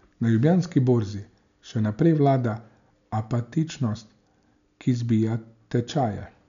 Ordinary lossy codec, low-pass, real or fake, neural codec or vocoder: none; 7.2 kHz; real; none